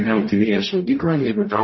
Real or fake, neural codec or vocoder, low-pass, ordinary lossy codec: fake; codec, 44.1 kHz, 0.9 kbps, DAC; 7.2 kHz; MP3, 24 kbps